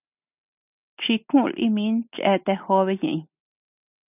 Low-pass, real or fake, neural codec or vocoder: 3.6 kHz; real; none